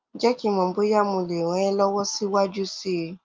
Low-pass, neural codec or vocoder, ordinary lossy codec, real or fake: 7.2 kHz; none; Opus, 24 kbps; real